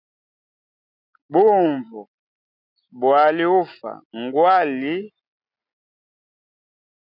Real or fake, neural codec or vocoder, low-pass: real; none; 5.4 kHz